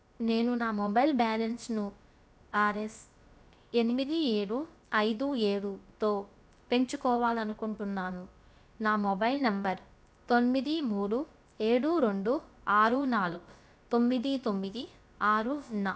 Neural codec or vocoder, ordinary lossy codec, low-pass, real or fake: codec, 16 kHz, about 1 kbps, DyCAST, with the encoder's durations; none; none; fake